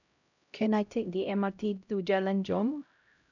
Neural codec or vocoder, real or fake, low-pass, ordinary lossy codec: codec, 16 kHz, 0.5 kbps, X-Codec, HuBERT features, trained on LibriSpeech; fake; 7.2 kHz; none